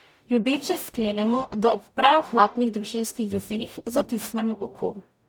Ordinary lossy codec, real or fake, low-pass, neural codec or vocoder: none; fake; none; codec, 44.1 kHz, 0.9 kbps, DAC